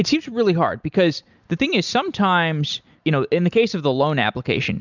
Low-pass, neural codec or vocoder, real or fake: 7.2 kHz; none; real